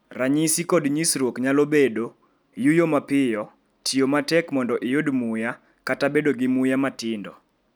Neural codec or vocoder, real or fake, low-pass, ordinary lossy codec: none; real; none; none